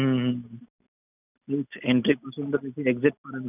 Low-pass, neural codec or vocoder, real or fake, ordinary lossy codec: 3.6 kHz; none; real; none